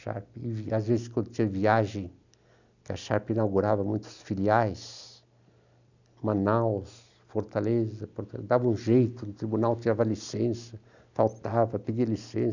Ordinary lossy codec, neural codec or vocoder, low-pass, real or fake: none; none; 7.2 kHz; real